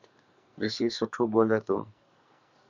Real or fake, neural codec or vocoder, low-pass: fake; codec, 44.1 kHz, 2.6 kbps, SNAC; 7.2 kHz